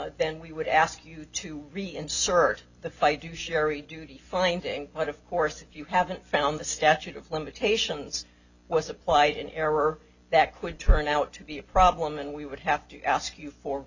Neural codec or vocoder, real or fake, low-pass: none; real; 7.2 kHz